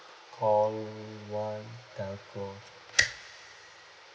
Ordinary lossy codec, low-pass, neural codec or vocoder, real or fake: none; none; none; real